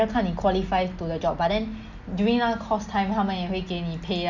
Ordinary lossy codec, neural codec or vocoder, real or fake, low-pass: none; none; real; 7.2 kHz